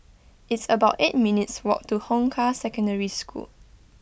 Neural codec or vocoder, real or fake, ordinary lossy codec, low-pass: none; real; none; none